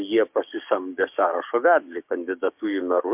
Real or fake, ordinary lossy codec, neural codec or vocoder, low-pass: fake; MP3, 32 kbps; codec, 16 kHz, 6 kbps, DAC; 3.6 kHz